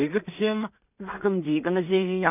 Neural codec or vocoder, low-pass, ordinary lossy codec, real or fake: codec, 16 kHz in and 24 kHz out, 0.4 kbps, LongCat-Audio-Codec, two codebook decoder; 3.6 kHz; none; fake